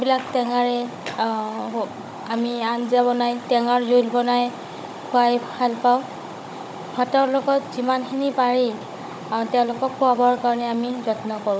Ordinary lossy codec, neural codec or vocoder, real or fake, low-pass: none; codec, 16 kHz, 16 kbps, FunCodec, trained on Chinese and English, 50 frames a second; fake; none